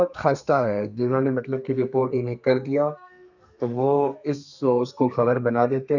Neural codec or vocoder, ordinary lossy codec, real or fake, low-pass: codec, 32 kHz, 1.9 kbps, SNAC; none; fake; 7.2 kHz